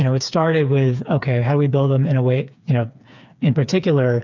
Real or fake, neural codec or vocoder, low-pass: fake; codec, 16 kHz, 4 kbps, FreqCodec, smaller model; 7.2 kHz